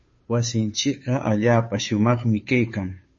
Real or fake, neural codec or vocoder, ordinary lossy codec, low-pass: fake; codec, 16 kHz, 2 kbps, FunCodec, trained on Chinese and English, 25 frames a second; MP3, 32 kbps; 7.2 kHz